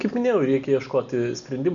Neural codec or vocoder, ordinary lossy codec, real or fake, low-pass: none; MP3, 64 kbps; real; 7.2 kHz